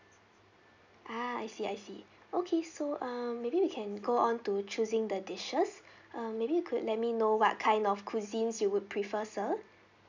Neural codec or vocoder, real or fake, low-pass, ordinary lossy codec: none; real; 7.2 kHz; none